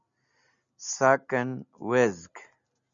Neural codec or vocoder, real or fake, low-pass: none; real; 7.2 kHz